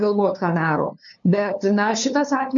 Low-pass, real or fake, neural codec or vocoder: 7.2 kHz; fake; codec, 16 kHz, 2 kbps, FunCodec, trained on LibriTTS, 25 frames a second